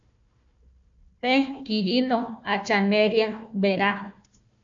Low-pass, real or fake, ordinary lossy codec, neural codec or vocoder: 7.2 kHz; fake; MP3, 64 kbps; codec, 16 kHz, 1 kbps, FunCodec, trained on Chinese and English, 50 frames a second